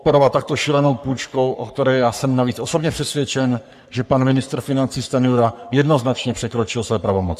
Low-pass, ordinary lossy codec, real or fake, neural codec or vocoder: 14.4 kHz; AAC, 96 kbps; fake; codec, 44.1 kHz, 3.4 kbps, Pupu-Codec